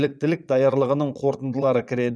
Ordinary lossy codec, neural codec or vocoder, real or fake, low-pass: none; vocoder, 22.05 kHz, 80 mel bands, Vocos; fake; none